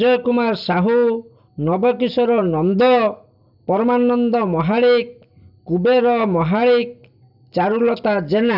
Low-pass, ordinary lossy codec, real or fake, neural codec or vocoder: 5.4 kHz; none; real; none